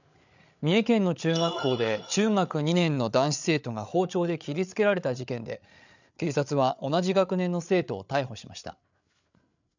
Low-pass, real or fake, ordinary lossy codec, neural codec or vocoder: 7.2 kHz; fake; none; codec, 16 kHz, 8 kbps, FreqCodec, larger model